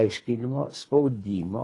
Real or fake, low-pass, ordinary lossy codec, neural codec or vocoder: fake; 10.8 kHz; AAC, 32 kbps; codec, 24 kHz, 3 kbps, HILCodec